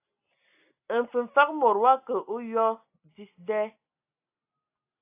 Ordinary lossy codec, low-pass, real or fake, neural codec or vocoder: AAC, 32 kbps; 3.6 kHz; real; none